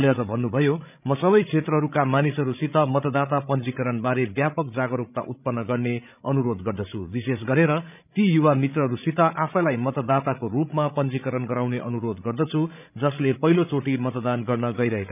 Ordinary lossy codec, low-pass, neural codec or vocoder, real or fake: none; 3.6 kHz; codec, 16 kHz, 16 kbps, FreqCodec, larger model; fake